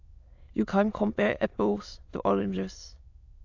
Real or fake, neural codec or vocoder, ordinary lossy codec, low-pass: fake; autoencoder, 22.05 kHz, a latent of 192 numbers a frame, VITS, trained on many speakers; AAC, 48 kbps; 7.2 kHz